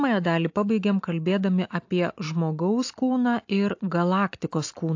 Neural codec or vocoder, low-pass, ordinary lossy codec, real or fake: none; 7.2 kHz; AAC, 48 kbps; real